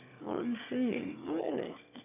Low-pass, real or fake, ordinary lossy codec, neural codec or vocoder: 3.6 kHz; fake; none; autoencoder, 22.05 kHz, a latent of 192 numbers a frame, VITS, trained on one speaker